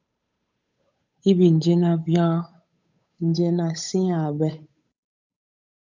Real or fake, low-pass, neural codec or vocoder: fake; 7.2 kHz; codec, 16 kHz, 8 kbps, FunCodec, trained on Chinese and English, 25 frames a second